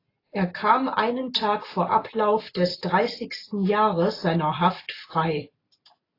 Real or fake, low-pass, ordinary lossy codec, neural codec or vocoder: real; 5.4 kHz; AAC, 24 kbps; none